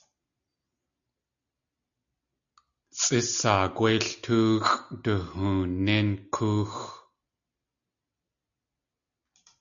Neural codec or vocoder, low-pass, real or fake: none; 7.2 kHz; real